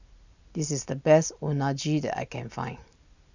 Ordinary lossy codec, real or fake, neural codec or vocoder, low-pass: none; real; none; 7.2 kHz